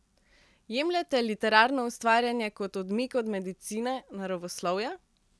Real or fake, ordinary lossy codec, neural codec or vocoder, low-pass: real; none; none; none